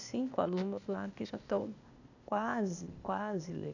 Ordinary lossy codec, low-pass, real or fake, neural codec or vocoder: none; 7.2 kHz; fake; codec, 16 kHz, 0.8 kbps, ZipCodec